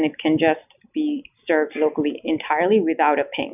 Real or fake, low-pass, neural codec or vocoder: real; 3.6 kHz; none